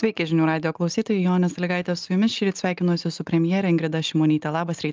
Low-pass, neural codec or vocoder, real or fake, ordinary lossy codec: 7.2 kHz; none; real; Opus, 24 kbps